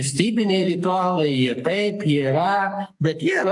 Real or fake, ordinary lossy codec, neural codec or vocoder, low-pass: fake; AAC, 64 kbps; codec, 44.1 kHz, 2.6 kbps, SNAC; 10.8 kHz